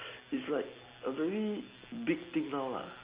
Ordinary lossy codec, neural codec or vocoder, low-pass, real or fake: Opus, 32 kbps; none; 3.6 kHz; real